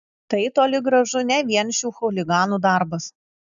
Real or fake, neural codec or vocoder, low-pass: real; none; 7.2 kHz